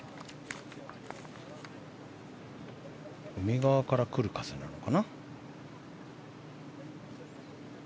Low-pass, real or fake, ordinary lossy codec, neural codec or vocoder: none; real; none; none